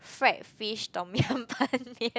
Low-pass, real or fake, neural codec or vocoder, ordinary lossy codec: none; real; none; none